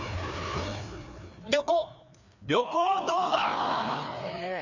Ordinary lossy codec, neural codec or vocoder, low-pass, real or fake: none; codec, 16 kHz, 2 kbps, FreqCodec, larger model; 7.2 kHz; fake